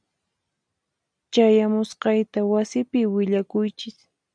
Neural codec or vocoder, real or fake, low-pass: none; real; 9.9 kHz